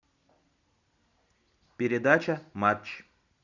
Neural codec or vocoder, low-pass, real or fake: none; 7.2 kHz; real